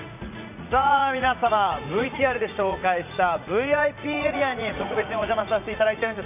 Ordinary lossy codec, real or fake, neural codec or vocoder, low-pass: none; fake; vocoder, 44.1 kHz, 80 mel bands, Vocos; 3.6 kHz